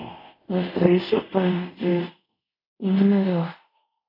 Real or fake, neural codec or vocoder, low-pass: fake; codec, 24 kHz, 0.5 kbps, DualCodec; 5.4 kHz